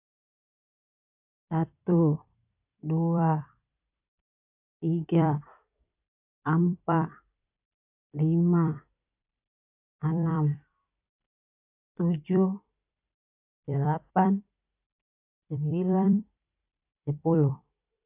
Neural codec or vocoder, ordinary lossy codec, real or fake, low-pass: vocoder, 44.1 kHz, 128 mel bands every 256 samples, BigVGAN v2; AAC, 32 kbps; fake; 3.6 kHz